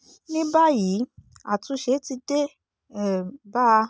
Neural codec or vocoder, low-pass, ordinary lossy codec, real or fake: none; none; none; real